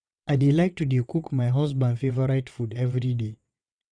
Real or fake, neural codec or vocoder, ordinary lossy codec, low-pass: fake; vocoder, 22.05 kHz, 80 mel bands, WaveNeXt; none; 9.9 kHz